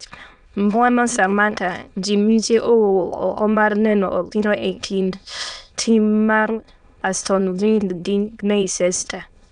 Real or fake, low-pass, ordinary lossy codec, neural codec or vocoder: fake; 9.9 kHz; none; autoencoder, 22.05 kHz, a latent of 192 numbers a frame, VITS, trained on many speakers